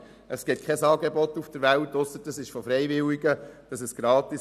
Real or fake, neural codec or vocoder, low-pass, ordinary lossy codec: real; none; 14.4 kHz; none